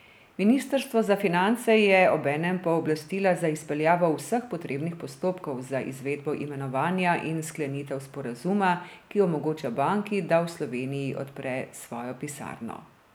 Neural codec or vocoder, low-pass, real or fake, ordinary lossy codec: none; none; real; none